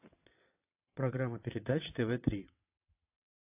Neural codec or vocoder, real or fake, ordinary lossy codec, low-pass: vocoder, 22.05 kHz, 80 mel bands, WaveNeXt; fake; AAC, 24 kbps; 3.6 kHz